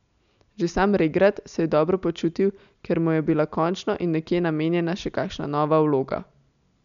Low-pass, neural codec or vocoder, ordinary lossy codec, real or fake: 7.2 kHz; none; none; real